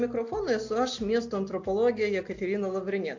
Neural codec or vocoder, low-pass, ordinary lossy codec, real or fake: none; 7.2 kHz; AAC, 48 kbps; real